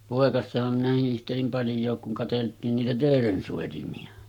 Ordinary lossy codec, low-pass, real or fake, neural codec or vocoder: none; 19.8 kHz; fake; codec, 44.1 kHz, 7.8 kbps, Pupu-Codec